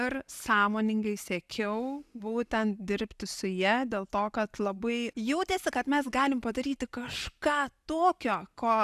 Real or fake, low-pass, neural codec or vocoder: real; 14.4 kHz; none